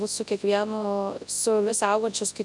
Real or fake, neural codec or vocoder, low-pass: fake; codec, 24 kHz, 0.9 kbps, WavTokenizer, large speech release; 10.8 kHz